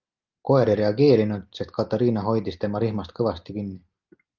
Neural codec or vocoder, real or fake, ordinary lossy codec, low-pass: none; real; Opus, 24 kbps; 7.2 kHz